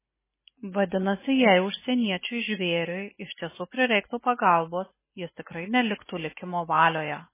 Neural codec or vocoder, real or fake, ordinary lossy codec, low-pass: none; real; MP3, 16 kbps; 3.6 kHz